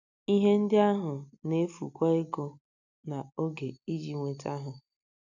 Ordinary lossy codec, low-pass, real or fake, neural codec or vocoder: none; 7.2 kHz; real; none